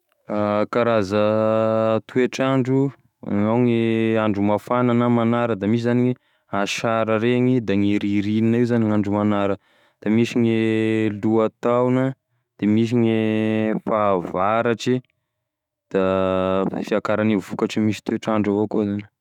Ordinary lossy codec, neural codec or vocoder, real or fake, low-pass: none; autoencoder, 48 kHz, 128 numbers a frame, DAC-VAE, trained on Japanese speech; fake; 19.8 kHz